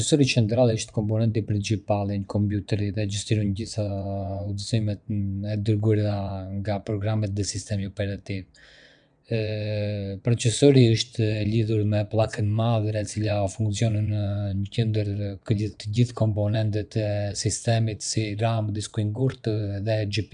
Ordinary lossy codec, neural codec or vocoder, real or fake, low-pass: none; vocoder, 22.05 kHz, 80 mel bands, WaveNeXt; fake; 9.9 kHz